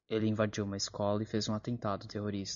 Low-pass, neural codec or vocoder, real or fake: 7.2 kHz; none; real